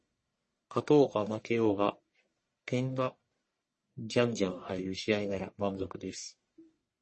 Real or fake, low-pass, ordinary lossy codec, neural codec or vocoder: fake; 10.8 kHz; MP3, 32 kbps; codec, 44.1 kHz, 1.7 kbps, Pupu-Codec